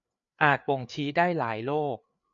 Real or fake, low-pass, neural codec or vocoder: fake; 7.2 kHz; codec, 16 kHz, 4 kbps, FreqCodec, larger model